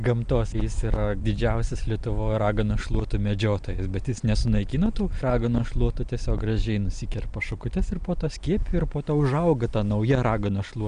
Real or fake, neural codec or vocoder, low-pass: real; none; 9.9 kHz